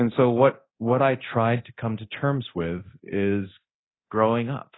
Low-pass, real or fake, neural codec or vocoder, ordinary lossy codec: 7.2 kHz; fake; codec, 24 kHz, 0.9 kbps, DualCodec; AAC, 16 kbps